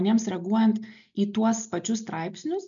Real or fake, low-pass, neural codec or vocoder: real; 7.2 kHz; none